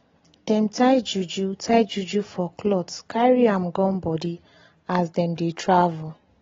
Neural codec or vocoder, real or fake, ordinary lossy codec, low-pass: none; real; AAC, 24 kbps; 7.2 kHz